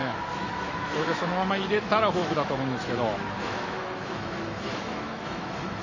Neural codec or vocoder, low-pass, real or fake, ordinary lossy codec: autoencoder, 48 kHz, 128 numbers a frame, DAC-VAE, trained on Japanese speech; 7.2 kHz; fake; MP3, 32 kbps